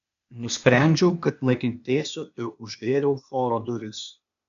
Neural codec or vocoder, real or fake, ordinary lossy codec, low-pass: codec, 16 kHz, 0.8 kbps, ZipCodec; fake; MP3, 96 kbps; 7.2 kHz